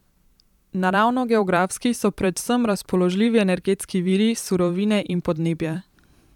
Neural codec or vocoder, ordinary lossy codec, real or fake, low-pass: vocoder, 44.1 kHz, 128 mel bands every 512 samples, BigVGAN v2; none; fake; 19.8 kHz